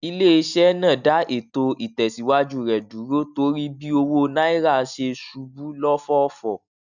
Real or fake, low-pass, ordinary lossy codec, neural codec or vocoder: real; 7.2 kHz; none; none